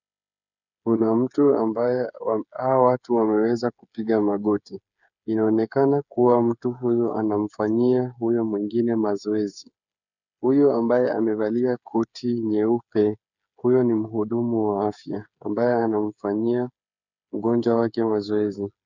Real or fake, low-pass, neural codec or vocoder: fake; 7.2 kHz; codec, 16 kHz, 8 kbps, FreqCodec, smaller model